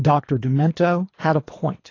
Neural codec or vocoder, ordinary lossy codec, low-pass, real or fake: codec, 24 kHz, 3 kbps, HILCodec; AAC, 32 kbps; 7.2 kHz; fake